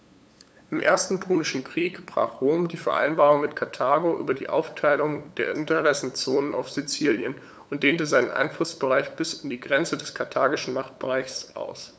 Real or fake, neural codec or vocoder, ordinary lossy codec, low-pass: fake; codec, 16 kHz, 8 kbps, FunCodec, trained on LibriTTS, 25 frames a second; none; none